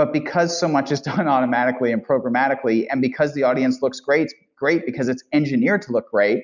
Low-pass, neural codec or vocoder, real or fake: 7.2 kHz; none; real